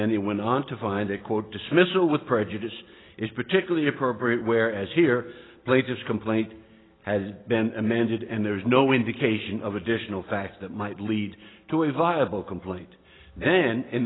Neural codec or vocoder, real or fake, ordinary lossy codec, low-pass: none; real; AAC, 16 kbps; 7.2 kHz